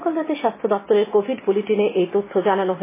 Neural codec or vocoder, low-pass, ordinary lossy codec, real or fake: none; 3.6 kHz; MP3, 16 kbps; real